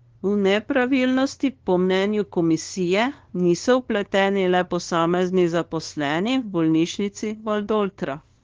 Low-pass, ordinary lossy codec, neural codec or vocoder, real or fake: 7.2 kHz; Opus, 16 kbps; codec, 16 kHz, 2 kbps, FunCodec, trained on LibriTTS, 25 frames a second; fake